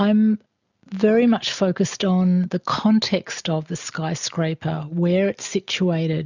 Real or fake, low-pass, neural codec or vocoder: real; 7.2 kHz; none